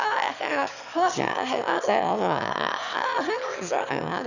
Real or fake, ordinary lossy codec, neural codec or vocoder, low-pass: fake; none; autoencoder, 22.05 kHz, a latent of 192 numbers a frame, VITS, trained on one speaker; 7.2 kHz